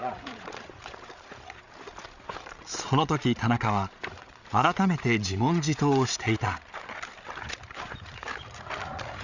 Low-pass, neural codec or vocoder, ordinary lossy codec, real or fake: 7.2 kHz; codec, 16 kHz, 8 kbps, FreqCodec, larger model; none; fake